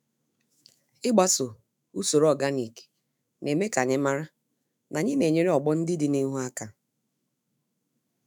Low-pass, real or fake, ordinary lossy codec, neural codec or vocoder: none; fake; none; autoencoder, 48 kHz, 128 numbers a frame, DAC-VAE, trained on Japanese speech